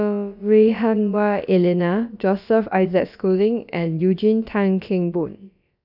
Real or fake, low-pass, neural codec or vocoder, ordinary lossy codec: fake; 5.4 kHz; codec, 16 kHz, about 1 kbps, DyCAST, with the encoder's durations; none